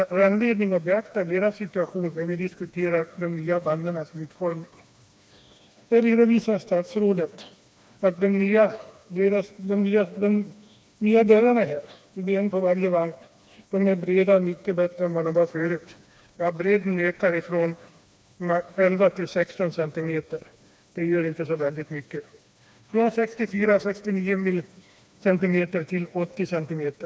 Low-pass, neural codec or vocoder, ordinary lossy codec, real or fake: none; codec, 16 kHz, 2 kbps, FreqCodec, smaller model; none; fake